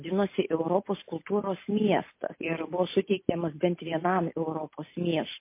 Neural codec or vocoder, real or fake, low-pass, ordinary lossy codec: none; real; 3.6 kHz; MP3, 24 kbps